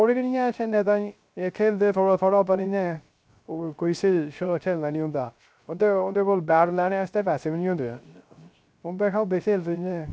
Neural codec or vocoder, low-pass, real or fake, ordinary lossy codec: codec, 16 kHz, 0.3 kbps, FocalCodec; none; fake; none